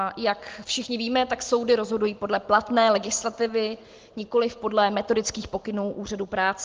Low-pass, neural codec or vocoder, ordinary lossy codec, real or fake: 7.2 kHz; none; Opus, 16 kbps; real